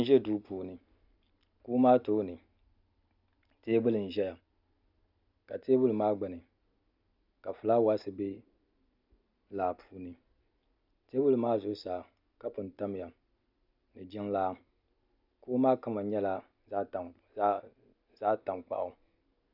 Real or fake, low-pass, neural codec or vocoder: real; 5.4 kHz; none